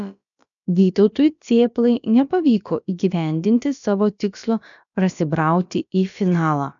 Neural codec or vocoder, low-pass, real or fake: codec, 16 kHz, about 1 kbps, DyCAST, with the encoder's durations; 7.2 kHz; fake